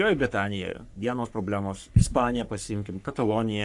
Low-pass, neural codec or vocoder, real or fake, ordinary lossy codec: 10.8 kHz; codec, 44.1 kHz, 3.4 kbps, Pupu-Codec; fake; AAC, 64 kbps